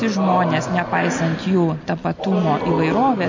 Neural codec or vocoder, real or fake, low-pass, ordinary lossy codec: none; real; 7.2 kHz; MP3, 48 kbps